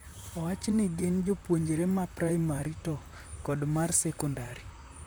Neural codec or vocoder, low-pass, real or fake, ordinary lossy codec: vocoder, 44.1 kHz, 128 mel bands, Pupu-Vocoder; none; fake; none